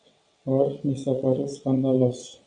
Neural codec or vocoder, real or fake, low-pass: vocoder, 22.05 kHz, 80 mel bands, WaveNeXt; fake; 9.9 kHz